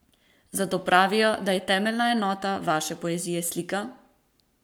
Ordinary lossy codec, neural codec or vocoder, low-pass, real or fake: none; codec, 44.1 kHz, 7.8 kbps, Pupu-Codec; none; fake